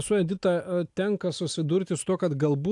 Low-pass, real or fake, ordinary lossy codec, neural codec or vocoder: 10.8 kHz; real; AAC, 64 kbps; none